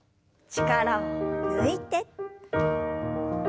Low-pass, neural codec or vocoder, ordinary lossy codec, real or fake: none; none; none; real